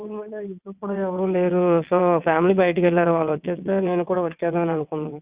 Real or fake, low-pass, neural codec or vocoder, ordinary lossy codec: fake; 3.6 kHz; vocoder, 22.05 kHz, 80 mel bands, WaveNeXt; Opus, 64 kbps